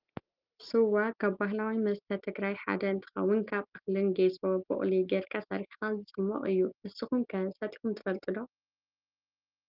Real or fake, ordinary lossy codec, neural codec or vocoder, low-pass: real; Opus, 24 kbps; none; 5.4 kHz